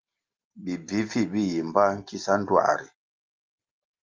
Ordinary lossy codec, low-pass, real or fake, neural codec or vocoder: Opus, 24 kbps; 7.2 kHz; real; none